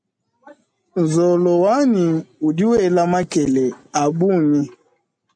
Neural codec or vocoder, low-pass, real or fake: none; 9.9 kHz; real